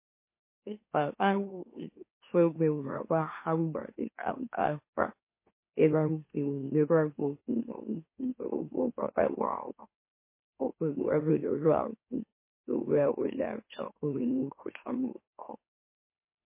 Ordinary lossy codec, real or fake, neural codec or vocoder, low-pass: MP3, 24 kbps; fake; autoencoder, 44.1 kHz, a latent of 192 numbers a frame, MeloTTS; 3.6 kHz